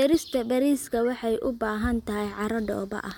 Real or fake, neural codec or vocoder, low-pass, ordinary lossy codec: real; none; 19.8 kHz; MP3, 96 kbps